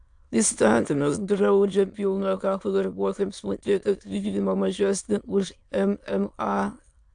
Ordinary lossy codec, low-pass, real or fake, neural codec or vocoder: AAC, 64 kbps; 9.9 kHz; fake; autoencoder, 22.05 kHz, a latent of 192 numbers a frame, VITS, trained on many speakers